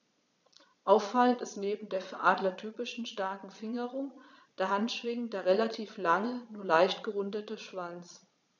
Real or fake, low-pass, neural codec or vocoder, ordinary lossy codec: fake; 7.2 kHz; vocoder, 22.05 kHz, 80 mel bands, Vocos; none